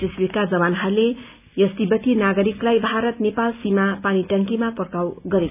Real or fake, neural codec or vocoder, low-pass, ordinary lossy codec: real; none; 3.6 kHz; none